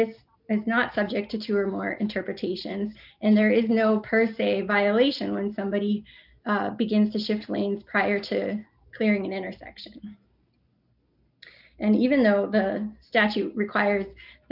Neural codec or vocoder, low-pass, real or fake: none; 5.4 kHz; real